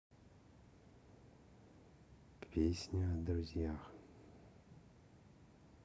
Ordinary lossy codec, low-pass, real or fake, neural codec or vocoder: none; none; real; none